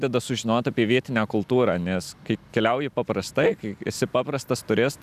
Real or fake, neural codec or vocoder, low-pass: real; none; 14.4 kHz